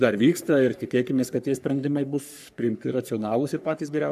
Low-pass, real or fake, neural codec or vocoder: 14.4 kHz; fake; codec, 44.1 kHz, 3.4 kbps, Pupu-Codec